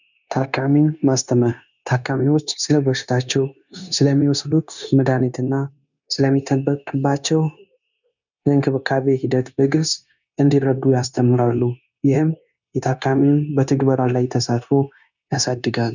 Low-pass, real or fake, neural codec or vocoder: 7.2 kHz; fake; codec, 16 kHz, 0.9 kbps, LongCat-Audio-Codec